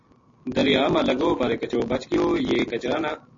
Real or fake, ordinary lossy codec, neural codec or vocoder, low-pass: real; MP3, 32 kbps; none; 7.2 kHz